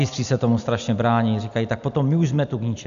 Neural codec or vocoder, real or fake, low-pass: none; real; 7.2 kHz